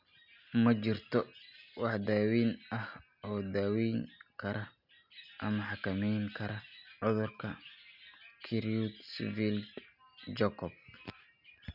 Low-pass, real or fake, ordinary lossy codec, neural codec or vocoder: 5.4 kHz; real; none; none